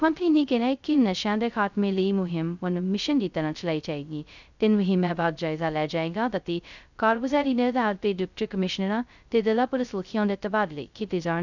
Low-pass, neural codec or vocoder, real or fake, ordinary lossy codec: 7.2 kHz; codec, 16 kHz, 0.2 kbps, FocalCodec; fake; none